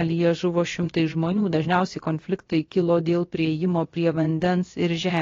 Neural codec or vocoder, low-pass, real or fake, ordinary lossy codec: codec, 16 kHz, about 1 kbps, DyCAST, with the encoder's durations; 7.2 kHz; fake; AAC, 32 kbps